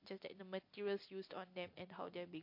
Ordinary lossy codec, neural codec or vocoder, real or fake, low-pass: MP3, 32 kbps; none; real; 5.4 kHz